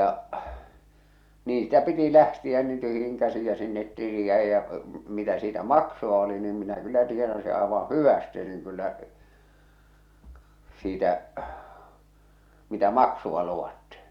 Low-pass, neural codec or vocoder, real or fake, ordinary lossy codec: 19.8 kHz; none; real; Opus, 64 kbps